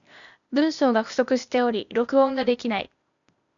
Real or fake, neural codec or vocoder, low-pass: fake; codec, 16 kHz, 0.8 kbps, ZipCodec; 7.2 kHz